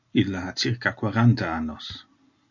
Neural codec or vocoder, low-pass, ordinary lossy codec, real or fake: none; 7.2 kHz; MP3, 48 kbps; real